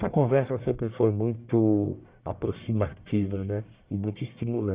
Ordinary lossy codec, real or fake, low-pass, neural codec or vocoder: Opus, 64 kbps; fake; 3.6 kHz; codec, 44.1 kHz, 1.7 kbps, Pupu-Codec